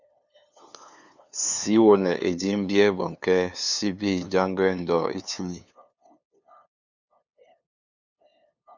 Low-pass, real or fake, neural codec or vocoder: 7.2 kHz; fake; codec, 16 kHz, 2 kbps, FunCodec, trained on LibriTTS, 25 frames a second